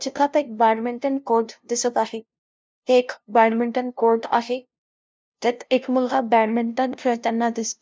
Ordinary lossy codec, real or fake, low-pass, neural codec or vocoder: none; fake; none; codec, 16 kHz, 0.5 kbps, FunCodec, trained on LibriTTS, 25 frames a second